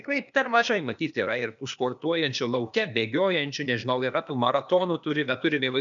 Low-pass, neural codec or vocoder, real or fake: 7.2 kHz; codec, 16 kHz, 0.8 kbps, ZipCodec; fake